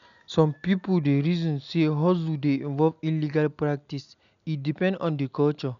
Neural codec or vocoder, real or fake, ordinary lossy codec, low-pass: none; real; none; 7.2 kHz